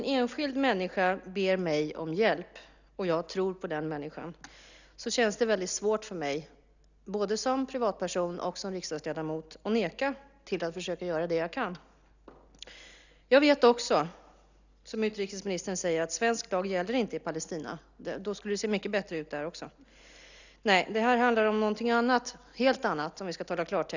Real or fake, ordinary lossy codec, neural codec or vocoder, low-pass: real; none; none; 7.2 kHz